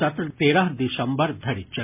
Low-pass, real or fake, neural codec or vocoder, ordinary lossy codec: 3.6 kHz; real; none; MP3, 32 kbps